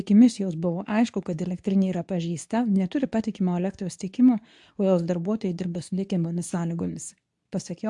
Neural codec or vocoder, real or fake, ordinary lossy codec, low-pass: codec, 24 kHz, 0.9 kbps, WavTokenizer, medium speech release version 2; fake; AAC, 64 kbps; 10.8 kHz